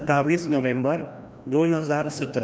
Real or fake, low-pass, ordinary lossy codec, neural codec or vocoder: fake; none; none; codec, 16 kHz, 1 kbps, FreqCodec, larger model